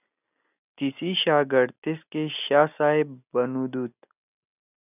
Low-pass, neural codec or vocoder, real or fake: 3.6 kHz; none; real